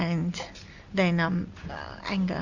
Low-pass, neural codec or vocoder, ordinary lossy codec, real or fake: 7.2 kHz; none; Opus, 64 kbps; real